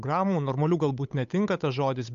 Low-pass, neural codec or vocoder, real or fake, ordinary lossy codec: 7.2 kHz; codec, 16 kHz, 8 kbps, FunCodec, trained on Chinese and English, 25 frames a second; fake; AAC, 96 kbps